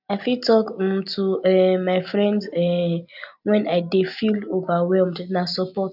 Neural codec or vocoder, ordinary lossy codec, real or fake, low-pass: none; none; real; 5.4 kHz